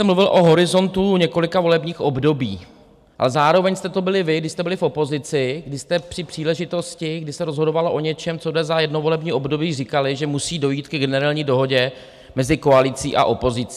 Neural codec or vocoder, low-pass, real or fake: none; 14.4 kHz; real